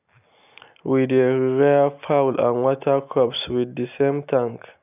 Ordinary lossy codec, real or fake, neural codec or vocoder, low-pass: none; real; none; 3.6 kHz